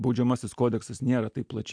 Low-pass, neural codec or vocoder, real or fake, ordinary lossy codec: 9.9 kHz; none; real; AAC, 64 kbps